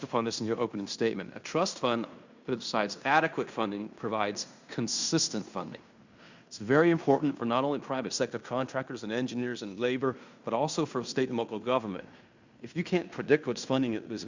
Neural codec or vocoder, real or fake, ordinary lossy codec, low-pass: codec, 16 kHz in and 24 kHz out, 0.9 kbps, LongCat-Audio-Codec, fine tuned four codebook decoder; fake; Opus, 64 kbps; 7.2 kHz